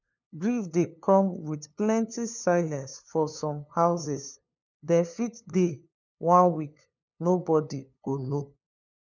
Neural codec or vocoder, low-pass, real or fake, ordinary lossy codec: codec, 16 kHz, 2 kbps, FunCodec, trained on LibriTTS, 25 frames a second; 7.2 kHz; fake; none